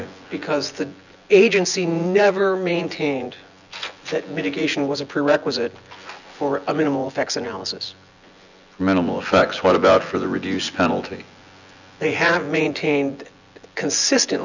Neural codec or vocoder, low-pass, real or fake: vocoder, 24 kHz, 100 mel bands, Vocos; 7.2 kHz; fake